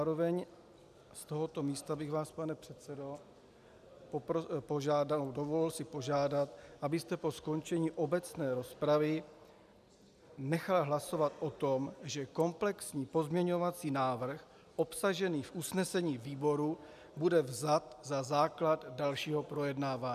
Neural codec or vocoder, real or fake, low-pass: none; real; 14.4 kHz